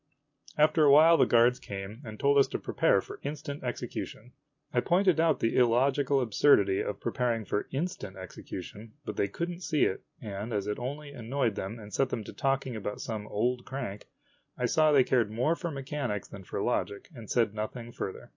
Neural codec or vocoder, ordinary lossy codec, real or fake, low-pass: none; MP3, 48 kbps; real; 7.2 kHz